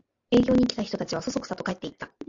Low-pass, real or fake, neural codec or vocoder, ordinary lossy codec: 7.2 kHz; real; none; AAC, 64 kbps